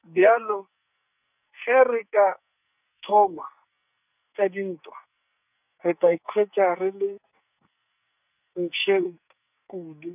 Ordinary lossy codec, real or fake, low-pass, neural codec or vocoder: none; fake; 3.6 kHz; codec, 44.1 kHz, 2.6 kbps, SNAC